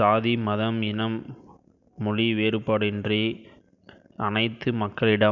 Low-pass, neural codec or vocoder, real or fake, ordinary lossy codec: 7.2 kHz; none; real; none